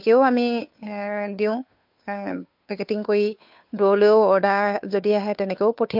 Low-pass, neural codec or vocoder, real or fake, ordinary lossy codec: 5.4 kHz; codec, 16 kHz, 2 kbps, FunCodec, trained on Chinese and English, 25 frames a second; fake; MP3, 48 kbps